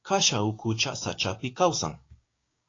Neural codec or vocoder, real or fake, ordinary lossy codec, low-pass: codec, 16 kHz, 6 kbps, DAC; fake; AAC, 32 kbps; 7.2 kHz